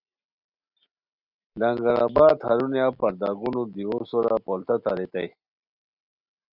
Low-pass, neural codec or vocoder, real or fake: 5.4 kHz; none; real